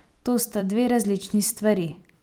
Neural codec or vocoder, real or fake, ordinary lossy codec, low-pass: vocoder, 44.1 kHz, 128 mel bands every 256 samples, BigVGAN v2; fake; Opus, 32 kbps; 19.8 kHz